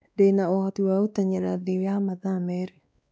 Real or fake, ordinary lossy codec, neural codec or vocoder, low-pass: fake; none; codec, 16 kHz, 2 kbps, X-Codec, WavLM features, trained on Multilingual LibriSpeech; none